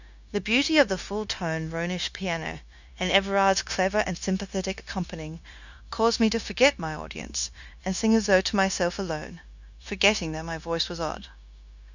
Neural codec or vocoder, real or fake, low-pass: codec, 16 kHz, 0.9 kbps, LongCat-Audio-Codec; fake; 7.2 kHz